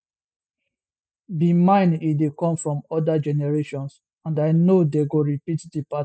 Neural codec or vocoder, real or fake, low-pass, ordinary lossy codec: none; real; none; none